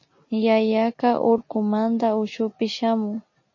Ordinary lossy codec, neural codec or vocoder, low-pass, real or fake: MP3, 32 kbps; none; 7.2 kHz; real